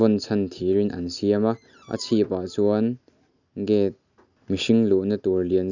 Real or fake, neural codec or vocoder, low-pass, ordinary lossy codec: real; none; 7.2 kHz; none